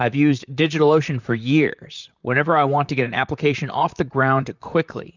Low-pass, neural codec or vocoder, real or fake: 7.2 kHz; vocoder, 44.1 kHz, 128 mel bands, Pupu-Vocoder; fake